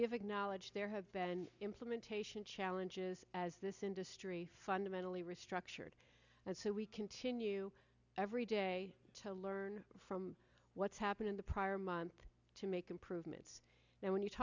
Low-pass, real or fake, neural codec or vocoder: 7.2 kHz; real; none